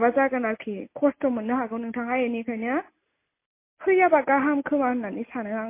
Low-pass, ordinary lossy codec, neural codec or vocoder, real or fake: 3.6 kHz; MP3, 24 kbps; none; real